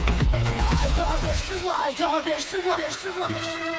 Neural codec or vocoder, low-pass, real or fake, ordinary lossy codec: codec, 16 kHz, 4 kbps, FreqCodec, smaller model; none; fake; none